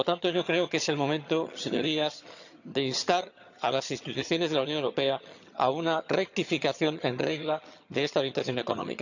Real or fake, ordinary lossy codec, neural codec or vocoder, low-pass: fake; none; vocoder, 22.05 kHz, 80 mel bands, HiFi-GAN; 7.2 kHz